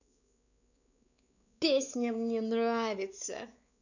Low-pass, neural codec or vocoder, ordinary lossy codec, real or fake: 7.2 kHz; codec, 16 kHz, 4 kbps, X-Codec, WavLM features, trained on Multilingual LibriSpeech; none; fake